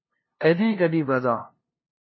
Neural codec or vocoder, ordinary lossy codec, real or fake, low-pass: codec, 16 kHz, 0.5 kbps, FunCodec, trained on LibriTTS, 25 frames a second; MP3, 24 kbps; fake; 7.2 kHz